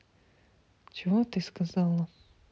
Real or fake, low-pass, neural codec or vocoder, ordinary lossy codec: real; none; none; none